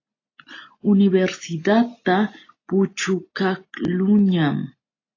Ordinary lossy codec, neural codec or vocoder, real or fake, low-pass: AAC, 32 kbps; none; real; 7.2 kHz